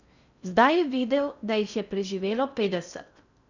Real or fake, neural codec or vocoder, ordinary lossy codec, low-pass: fake; codec, 16 kHz in and 24 kHz out, 0.6 kbps, FocalCodec, streaming, 4096 codes; none; 7.2 kHz